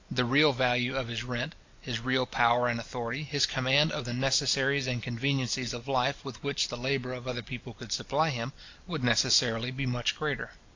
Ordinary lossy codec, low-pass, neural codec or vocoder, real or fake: AAC, 48 kbps; 7.2 kHz; vocoder, 44.1 kHz, 128 mel bands every 256 samples, BigVGAN v2; fake